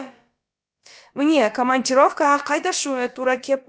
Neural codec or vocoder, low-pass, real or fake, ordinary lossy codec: codec, 16 kHz, about 1 kbps, DyCAST, with the encoder's durations; none; fake; none